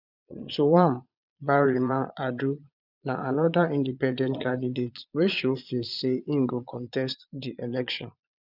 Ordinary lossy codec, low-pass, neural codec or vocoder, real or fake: none; 5.4 kHz; vocoder, 22.05 kHz, 80 mel bands, Vocos; fake